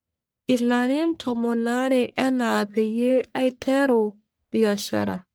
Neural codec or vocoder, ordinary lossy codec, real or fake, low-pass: codec, 44.1 kHz, 1.7 kbps, Pupu-Codec; none; fake; none